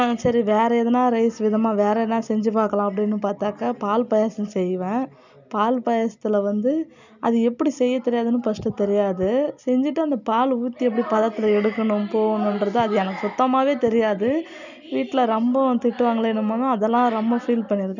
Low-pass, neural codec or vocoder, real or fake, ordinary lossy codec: 7.2 kHz; none; real; none